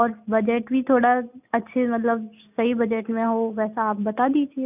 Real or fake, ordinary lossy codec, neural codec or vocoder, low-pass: real; none; none; 3.6 kHz